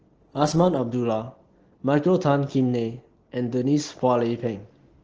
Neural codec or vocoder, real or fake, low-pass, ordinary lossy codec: none; real; 7.2 kHz; Opus, 16 kbps